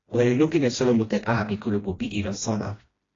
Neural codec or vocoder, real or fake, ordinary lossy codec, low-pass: codec, 16 kHz, 1 kbps, FreqCodec, smaller model; fake; AAC, 32 kbps; 7.2 kHz